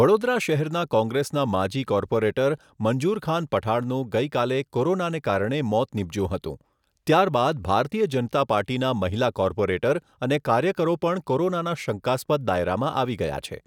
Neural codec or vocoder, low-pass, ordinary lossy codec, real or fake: none; 19.8 kHz; none; real